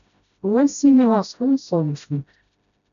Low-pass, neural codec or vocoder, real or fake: 7.2 kHz; codec, 16 kHz, 0.5 kbps, FreqCodec, smaller model; fake